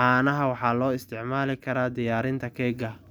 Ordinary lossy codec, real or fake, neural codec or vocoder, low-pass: none; real; none; none